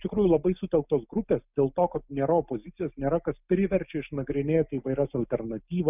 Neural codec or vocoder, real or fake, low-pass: none; real; 3.6 kHz